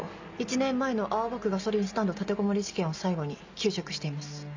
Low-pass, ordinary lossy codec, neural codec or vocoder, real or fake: 7.2 kHz; MP3, 64 kbps; none; real